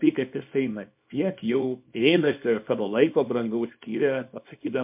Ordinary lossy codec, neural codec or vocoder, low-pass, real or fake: MP3, 32 kbps; codec, 24 kHz, 0.9 kbps, WavTokenizer, small release; 3.6 kHz; fake